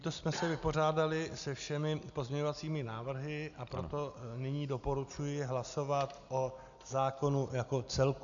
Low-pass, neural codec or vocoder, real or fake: 7.2 kHz; none; real